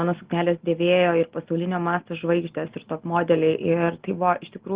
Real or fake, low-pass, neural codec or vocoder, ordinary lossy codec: real; 3.6 kHz; none; Opus, 16 kbps